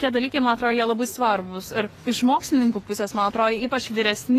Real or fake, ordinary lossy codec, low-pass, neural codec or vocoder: fake; AAC, 48 kbps; 14.4 kHz; codec, 44.1 kHz, 2.6 kbps, SNAC